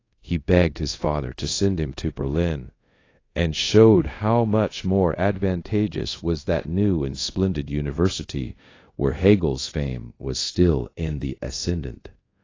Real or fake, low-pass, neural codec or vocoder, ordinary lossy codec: fake; 7.2 kHz; codec, 24 kHz, 0.5 kbps, DualCodec; AAC, 32 kbps